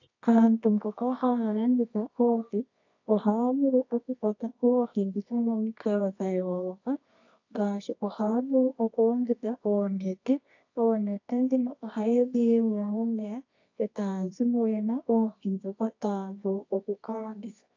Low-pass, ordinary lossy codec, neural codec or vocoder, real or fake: 7.2 kHz; AAC, 48 kbps; codec, 24 kHz, 0.9 kbps, WavTokenizer, medium music audio release; fake